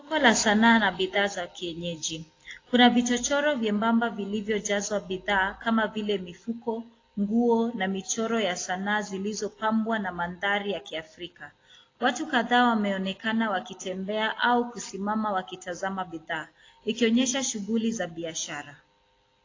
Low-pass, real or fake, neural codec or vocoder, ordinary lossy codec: 7.2 kHz; real; none; AAC, 32 kbps